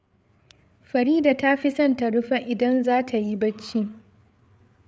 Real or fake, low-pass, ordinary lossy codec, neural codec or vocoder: fake; none; none; codec, 16 kHz, 8 kbps, FreqCodec, larger model